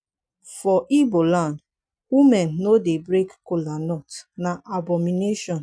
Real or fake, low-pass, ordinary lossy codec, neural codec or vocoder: real; 9.9 kHz; none; none